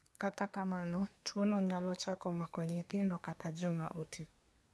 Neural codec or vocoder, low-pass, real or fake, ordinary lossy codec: codec, 24 kHz, 1 kbps, SNAC; none; fake; none